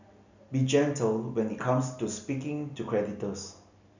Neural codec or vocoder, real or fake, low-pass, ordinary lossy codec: none; real; 7.2 kHz; none